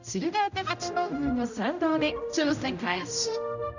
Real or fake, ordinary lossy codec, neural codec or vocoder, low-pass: fake; none; codec, 16 kHz, 0.5 kbps, X-Codec, HuBERT features, trained on general audio; 7.2 kHz